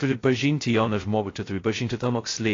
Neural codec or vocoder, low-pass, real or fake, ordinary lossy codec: codec, 16 kHz, 0.2 kbps, FocalCodec; 7.2 kHz; fake; AAC, 32 kbps